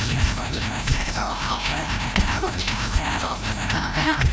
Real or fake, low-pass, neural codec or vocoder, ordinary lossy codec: fake; none; codec, 16 kHz, 0.5 kbps, FreqCodec, larger model; none